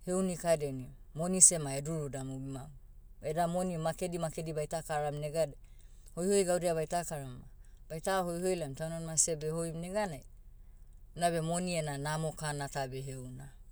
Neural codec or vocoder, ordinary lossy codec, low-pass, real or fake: none; none; none; real